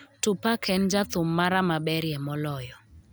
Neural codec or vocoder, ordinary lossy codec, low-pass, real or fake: none; none; none; real